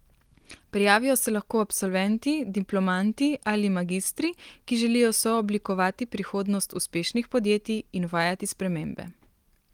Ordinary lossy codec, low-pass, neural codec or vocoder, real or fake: Opus, 24 kbps; 19.8 kHz; none; real